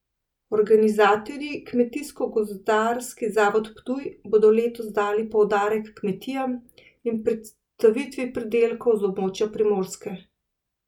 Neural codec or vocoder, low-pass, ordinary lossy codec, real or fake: none; 19.8 kHz; none; real